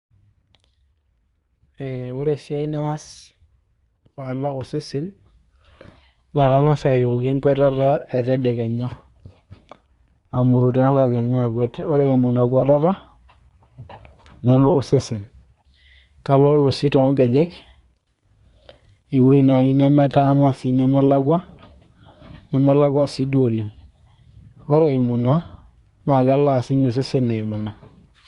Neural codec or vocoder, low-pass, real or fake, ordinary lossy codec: codec, 24 kHz, 1 kbps, SNAC; 10.8 kHz; fake; Opus, 64 kbps